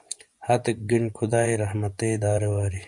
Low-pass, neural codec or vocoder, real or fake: 10.8 kHz; vocoder, 24 kHz, 100 mel bands, Vocos; fake